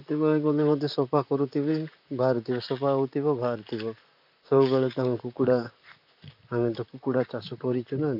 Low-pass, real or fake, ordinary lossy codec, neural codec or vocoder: 5.4 kHz; fake; AAC, 48 kbps; vocoder, 44.1 kHz, 128 mel bands, Pupu-Vocoder